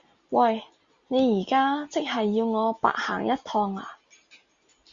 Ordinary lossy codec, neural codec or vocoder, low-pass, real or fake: Opus, 64 kbps; none; 7.2 kHz; real